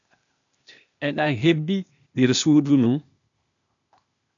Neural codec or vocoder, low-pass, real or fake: codec, 16 kHz, 0.8 kbps, ZipCodec; 7.2 kHz; fake